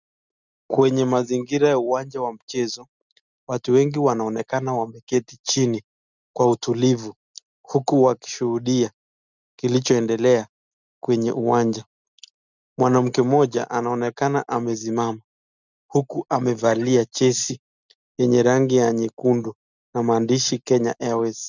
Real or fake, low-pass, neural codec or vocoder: real; 7.2 kHz; none